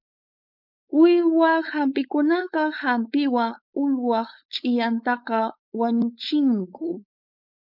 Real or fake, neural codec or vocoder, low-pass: fake; codec, 16 kHz, 4.8 kbps, FACodec; 5.4 kHz